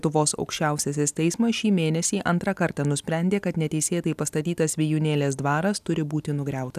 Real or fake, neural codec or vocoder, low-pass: real; none; 14.4 kHz